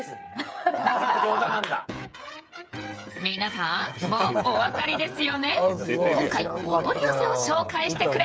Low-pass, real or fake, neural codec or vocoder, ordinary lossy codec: none; fake; codec, 16 kHz, 8 kbps, FreqCodec, smaller model; none